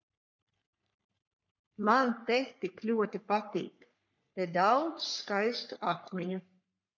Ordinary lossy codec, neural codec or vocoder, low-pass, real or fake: MP3, 64 kbps; codec, 44.1 kHz, 3.4 kbps, Pupu-Codec; 7.2 kHz; fake